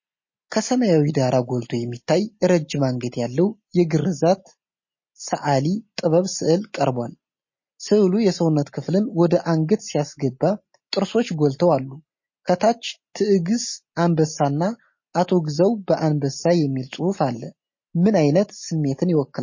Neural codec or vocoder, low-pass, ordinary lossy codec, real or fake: none; 7.2 kHz; MP3, 32 kbps; real